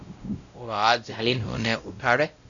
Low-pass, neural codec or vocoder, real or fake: 7.2 kHz; codec, 16 kHz, 0.5 kbps, X-Codec, WavLM features, trained on Multilingual LibriSpeech; fake